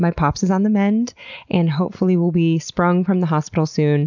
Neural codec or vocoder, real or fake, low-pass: none; real; 7.2 kHz